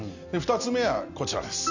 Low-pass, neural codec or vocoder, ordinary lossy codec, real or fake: 7.2 kHz; none; Opus, 64 kbps; real